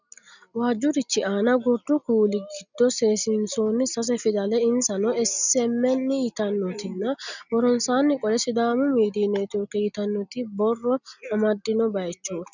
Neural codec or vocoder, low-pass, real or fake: none; 7.2 kHz; real